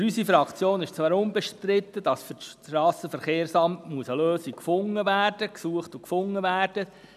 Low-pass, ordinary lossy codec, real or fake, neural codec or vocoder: 14.4 kHz; none; real; none